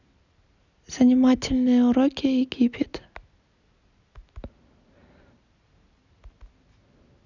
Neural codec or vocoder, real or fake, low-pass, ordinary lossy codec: none; real; 7.2 kHz; none